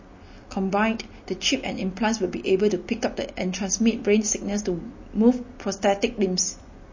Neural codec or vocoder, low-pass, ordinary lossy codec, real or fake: none; 7.2 kHz; MP3, 32 kbps; real